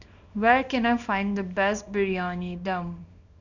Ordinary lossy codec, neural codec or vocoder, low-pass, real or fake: none; codec, 24 kHz, 0.9 kbps, WavTokenizer, small release; 7.2 kHz; fake